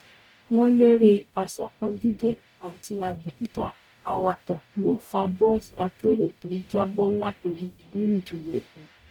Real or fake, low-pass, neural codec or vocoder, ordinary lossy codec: fake; 19.8 kHz; codec, 44.1 kHz, 0.9 kbps, DAC; none